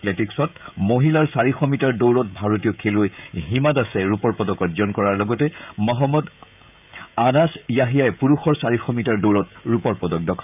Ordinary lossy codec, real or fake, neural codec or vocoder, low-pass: none; fake; codec, 16 kHz, 16 kbps, FreqCodec, smaller model; 3.6 kHz